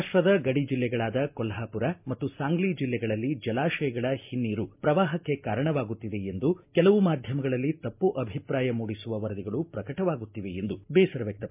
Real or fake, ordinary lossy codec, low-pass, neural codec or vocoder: real; MP3, 32 kbps; 3.6 kHz; none